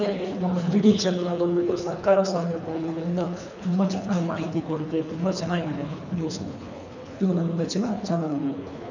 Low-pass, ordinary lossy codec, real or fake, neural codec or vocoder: 7.2 kHz; none; fake; codec, 24 kHz, 3 kbps, HILCodec